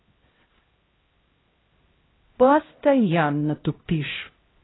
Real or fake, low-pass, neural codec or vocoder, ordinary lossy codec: fake; 7.2 kHz; codec, 16 kHz, 0.5 kbps, X-Codec, HuBERT features, trained on LibriSpeech; AAC, 16 kbps